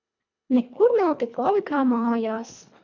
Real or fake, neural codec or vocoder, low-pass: fake; codec, 24 kHz, 1.5 kbps, HILCodec; 7.2 kHz